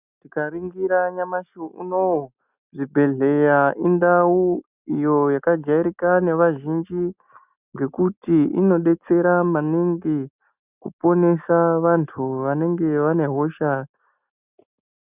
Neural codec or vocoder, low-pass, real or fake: none; 3.6 kHz; real